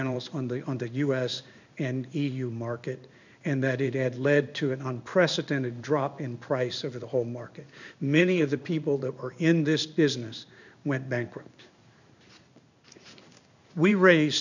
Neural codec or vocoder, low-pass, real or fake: codec, 16 kHz in and 24 kHz out, 1 kbps, XY-Tokenizer; 7.2 kHz; fake